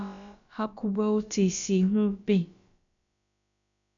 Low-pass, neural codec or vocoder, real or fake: 7.2 kHz; codec, 16 kHz, about 1 kbps, DyCAST, with the encoder's durations; fake